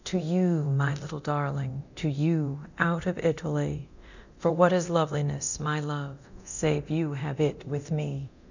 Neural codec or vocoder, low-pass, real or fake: codec, 24 kHz, 0.9 kbps, DualCodec; 7.2 kHz; fake